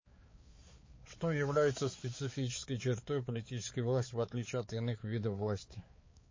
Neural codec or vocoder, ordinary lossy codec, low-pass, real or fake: codec, 16 kHz, 4 kbps, X-Codec, HuBERT features, trained on balanced general audio; MP3, 32 kbps; 7.2 kHz; fake